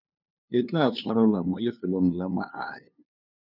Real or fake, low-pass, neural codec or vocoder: fake; 5.4 kHz; codec, 16 kHz, 2 kbps, FunCodec, trained on LibriTTS, 25 frames a second